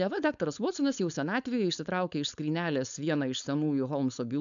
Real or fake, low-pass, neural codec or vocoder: fake; 7.2 kHz; codec, 16 kHz, 4.8 kbps, FACodec